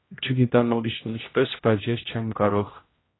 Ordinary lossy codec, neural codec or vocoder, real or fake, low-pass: AAC, 16 kbps; codec, 16 kHz, 0.5 kbps, X-Codec, HuBERT features, trained on general audio; fake; 7.2 kHz